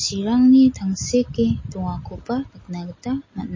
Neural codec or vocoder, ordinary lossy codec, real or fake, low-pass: none; MP3, 32 kbps; real; 7.2 kHz